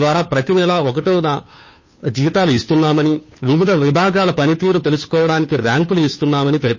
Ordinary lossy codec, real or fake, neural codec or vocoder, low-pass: MP3, 32 kbps; fake; codec, 16 kHz, 2 kbps, FunCodec, trained on Chinese and English, 25 frames a second; 7.2 kHz